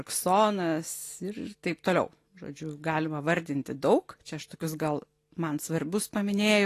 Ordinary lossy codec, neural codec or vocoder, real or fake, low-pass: AAC, 48 kbps; none; real; 14.4 kHz